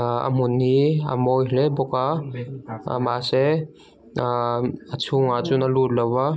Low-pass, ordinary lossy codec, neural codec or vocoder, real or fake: none; none; none; real